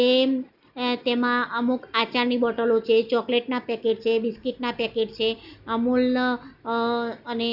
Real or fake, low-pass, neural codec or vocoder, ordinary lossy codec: real; 5.4 kHz; none; none